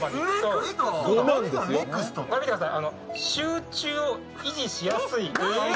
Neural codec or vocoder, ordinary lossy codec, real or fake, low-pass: none; none; real; none